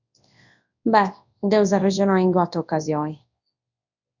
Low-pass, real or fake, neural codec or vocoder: 7.2 kHz; fake; codec, 24 kHz, 0.9 kbps, WavTokenizer, large speech release